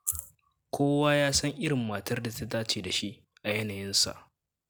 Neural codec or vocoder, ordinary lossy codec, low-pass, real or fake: none; none; none; real